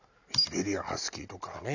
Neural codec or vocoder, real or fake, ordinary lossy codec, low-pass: none; real; none; 7.2 kHz